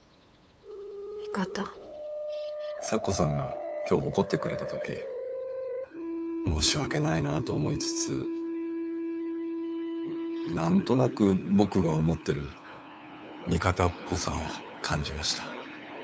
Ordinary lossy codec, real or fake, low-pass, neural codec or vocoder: none; fake; none; codec, 16 kHz, 8 kbps, FunCodec, trained on LibriTTS, 25 frames a second